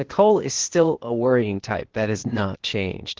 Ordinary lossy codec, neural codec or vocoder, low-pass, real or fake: Opus, 16 kbps; codec, 16 kHz, 0.8 kbps, ZipCodec; 7.2 kHz; fake